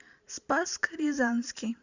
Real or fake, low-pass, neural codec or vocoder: fake; 7.2 kHz; vocoder, 44.1 kHz, 128 mel bands every 512 samples, BigVGAN v2